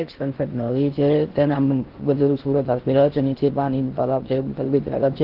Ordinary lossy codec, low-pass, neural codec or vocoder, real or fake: Opus, 16 kbps; 5.4 kHz; codec, 16 kHz in and 24 kHz out, 0.6 kbps, FocalCodec, streaming, 4096 codes; fake